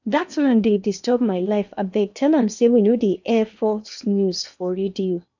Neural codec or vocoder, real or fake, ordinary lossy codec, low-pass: codec, 16 kHz in and 24 kHz out, 0.8 kbps, FocalCodec, streaming, 65536 codes; fake; none; 7.2 kHz